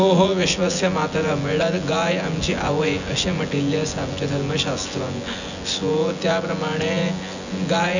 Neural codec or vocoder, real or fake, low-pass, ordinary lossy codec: vocoder, 24 kHz, 100 mel bands, Vocos; fake; 7.2 kHz; none